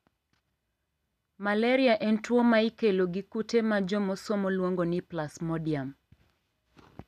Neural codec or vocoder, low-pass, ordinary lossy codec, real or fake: none; 14.4 kHz; none; real